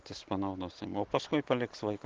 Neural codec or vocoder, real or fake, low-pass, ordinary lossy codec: none; real; 7.2 kHz; Opus, 16 kbps